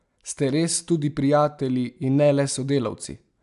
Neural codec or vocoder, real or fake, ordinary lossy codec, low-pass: none; real; AAC, 96 kbps; 10.8 kHz